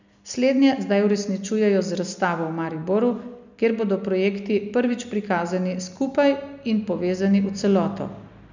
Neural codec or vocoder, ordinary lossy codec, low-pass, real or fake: none; none; 7.2 kHz; real